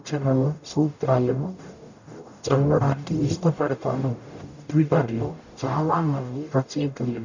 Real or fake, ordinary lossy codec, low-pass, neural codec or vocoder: fake; none; 7.2 kHz; codec, 44.1 kHz, 0.9 kbps, DAC